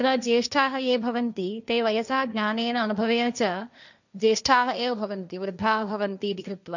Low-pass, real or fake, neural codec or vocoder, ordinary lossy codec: 7.2 kHz; fake; codec, 16 kHz, 1.1 kbps, Voila-Tokenizer; none